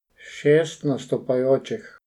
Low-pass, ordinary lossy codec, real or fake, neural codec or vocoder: 19.8 kHz; none; real; none